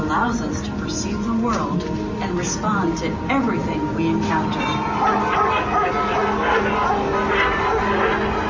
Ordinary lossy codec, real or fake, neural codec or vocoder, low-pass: MP3, 32 kbps; real; none; 7.2 kHz